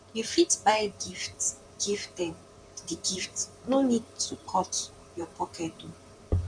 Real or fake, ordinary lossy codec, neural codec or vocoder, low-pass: fake; none; vocoder, 44.1 kHz, 128 mel bands, Pupu-Vocoder; 9.9 kHz